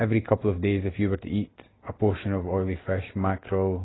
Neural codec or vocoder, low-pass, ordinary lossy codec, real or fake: none; 7.2 kHz; AAC, 16 kbps; real